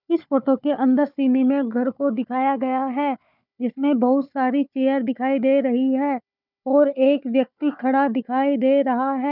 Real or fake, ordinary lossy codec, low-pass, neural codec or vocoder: fake; none; 5.4 kHz; codec, 16 kHz, 4 kbps, FunCodec, trained on Chinese and English, 50 frames a second